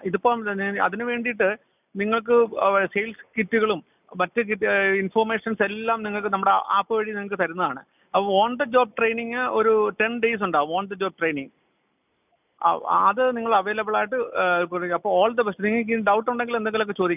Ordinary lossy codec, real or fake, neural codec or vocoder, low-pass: none; real; none; 3.6 kHz